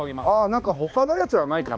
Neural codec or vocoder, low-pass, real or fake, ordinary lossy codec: codec, 16 kHz, 2 kbps, X-Codec, HuBERT features, trained on balanced general audio; none; fake; none